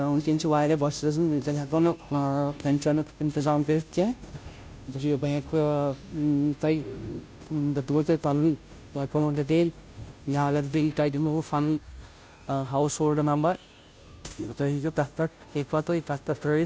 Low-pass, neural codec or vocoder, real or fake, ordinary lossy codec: none; codec, 16 kHz, 0.5 kbps, FunCodec, trained on Chinese and English, 25 frames a second; fake; none